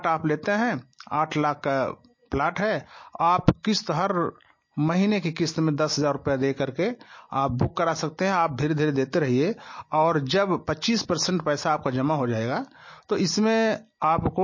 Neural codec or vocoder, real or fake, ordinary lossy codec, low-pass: none; real; MP3, 32 kbps; 7.2 kHz